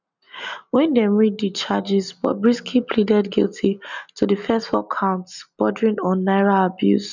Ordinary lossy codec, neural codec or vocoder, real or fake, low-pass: none; none; real; 7.2 kHz